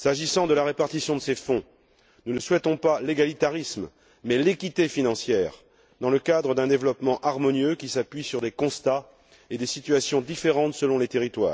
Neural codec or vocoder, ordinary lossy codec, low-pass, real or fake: none; none; none; real